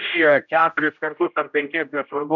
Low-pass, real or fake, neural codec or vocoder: 7.2 kHz; fake; codec, 16 kHz, 0.5 kbps, X-Codec, HuBERT features, trained on general audio